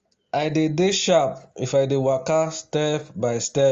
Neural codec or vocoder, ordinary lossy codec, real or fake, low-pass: none; Opus, 32 kbps; real; 7.2 kHz